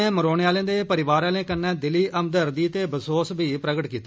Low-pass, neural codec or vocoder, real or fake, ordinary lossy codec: none; none; real; none